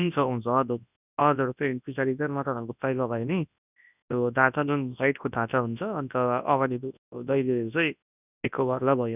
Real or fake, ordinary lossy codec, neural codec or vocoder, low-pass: fake; none; codec, 24 kHz, 0.9 kbps, WavTokenizer, large speech release; 3.6 kHz